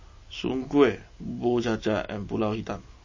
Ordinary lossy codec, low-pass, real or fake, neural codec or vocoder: MP3, 32 kbps; 7.2 kHz; real; none